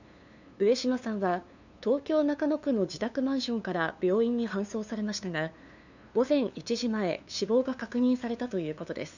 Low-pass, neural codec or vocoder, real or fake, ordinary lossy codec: 7.2 kHz; codec, 16 kHz, 2 kbps, FunCodec, trained on LibriTTS, 25 frames a second; fake; none